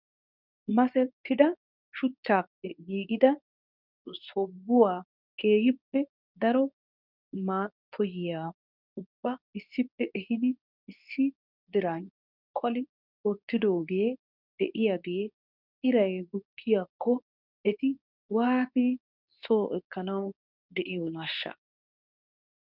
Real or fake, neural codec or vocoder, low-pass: fake; codec, 24 kHz, 0.9 kbps, WavTokenizer, medium speech release version 2; 5.4 kHz